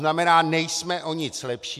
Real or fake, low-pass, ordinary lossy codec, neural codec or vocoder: real; 14.4 kHz; MP3, 96 kbps; none